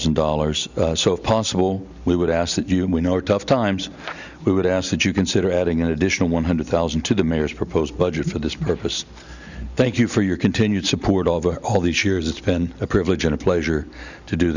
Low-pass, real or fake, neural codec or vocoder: 7.2 kHz; real; none